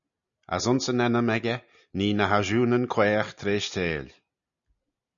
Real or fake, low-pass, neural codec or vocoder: real; 7.2 kHz; none